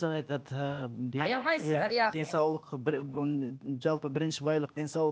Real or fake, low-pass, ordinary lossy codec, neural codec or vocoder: fake; none; none; codec, 16 kHz, 0.8 kbps, ZipCodec